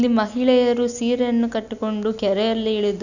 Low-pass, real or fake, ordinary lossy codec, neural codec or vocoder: 7.2 kHz; real; none; none